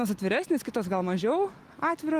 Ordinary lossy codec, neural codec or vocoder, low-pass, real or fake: Opus, 24 kbps; none; 14.4 kHz; real